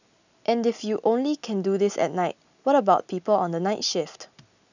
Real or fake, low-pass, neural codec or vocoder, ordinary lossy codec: real; 7.2 kHz; none; none